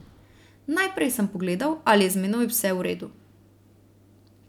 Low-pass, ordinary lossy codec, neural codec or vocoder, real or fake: 19.8 kHz; none; none; real